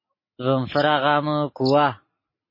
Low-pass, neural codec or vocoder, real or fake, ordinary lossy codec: 5.4 kHz; none; real; MP3, 24 kbps